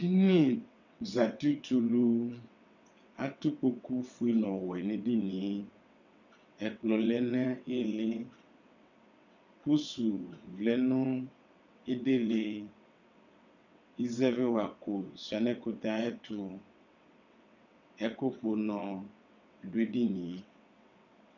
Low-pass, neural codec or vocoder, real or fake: 7.2 kHz; vocoder, 22.05 kHz, 80 mel bands, WaveNeXt; fake